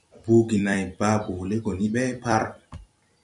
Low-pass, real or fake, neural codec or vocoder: 10.8 kHz; fake; vocoder, 44.1 kHz, 128 mel bands every 256 samples, BigVGAN v2